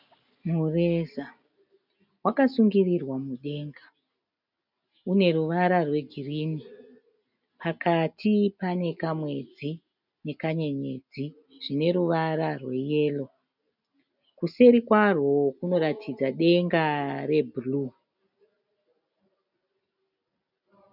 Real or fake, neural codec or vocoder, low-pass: real; none; 5.4 kHz